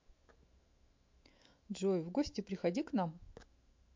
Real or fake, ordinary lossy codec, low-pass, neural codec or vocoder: fake; MP3, 48 kbps; 7.2 kHz; autoencoder, 48 kHz, 128 numbers a frame, DAC-VAE, trained on Japanese speech